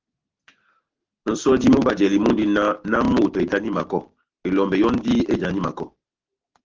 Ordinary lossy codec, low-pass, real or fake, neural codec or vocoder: Opus, 16 kbps; 7.2 kHz; real; none